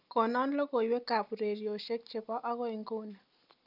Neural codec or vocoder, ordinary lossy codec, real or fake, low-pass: none; none; real; 5.4 kHz